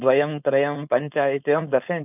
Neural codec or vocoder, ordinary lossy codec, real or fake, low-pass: codec, 16 kHz, 4.8 kbps, FACodec; none; fake; 3.6 kHz